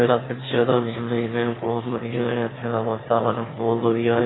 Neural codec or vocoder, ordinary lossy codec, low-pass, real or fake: autoencoder, 22.05 kHz, a latent of 192 numbers a frame, VITS, trained on one speaker; AAC, 16 kbps; 7.2 kHz; fake